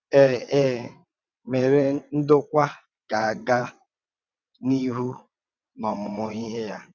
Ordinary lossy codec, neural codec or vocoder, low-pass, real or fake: none; vocoder, 22.05 kHz, 80 mel bands, WaveNeXt; 7.2 kHz; fake